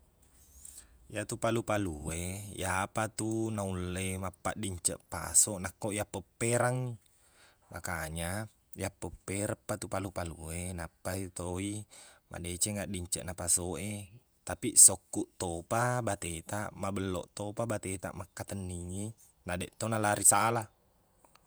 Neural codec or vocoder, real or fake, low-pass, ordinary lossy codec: vocoder, 48 kHz, 128 mel bands, Vocos; fake; none; none